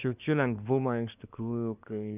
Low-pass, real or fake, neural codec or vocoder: 3.6 kHz; fake; codec, 24 kHz, 1 kbps, SNAC